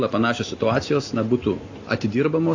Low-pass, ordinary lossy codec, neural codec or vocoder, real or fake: 7.2 kHz; AAC, 48 kbps; none; real